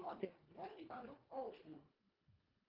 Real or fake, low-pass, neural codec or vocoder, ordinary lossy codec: fake; 5.4 kHz; codec, 24 kHz, 1.5 kbps, HILCodec; Opus, 24 kbps